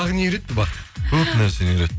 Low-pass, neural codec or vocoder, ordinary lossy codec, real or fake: none; none; none; real